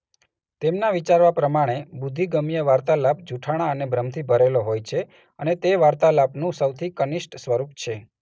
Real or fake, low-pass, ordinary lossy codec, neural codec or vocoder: real; none; none; none